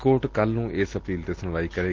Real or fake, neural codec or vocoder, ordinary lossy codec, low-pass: real; none; Opus, 16 kbps; 7.2 kHz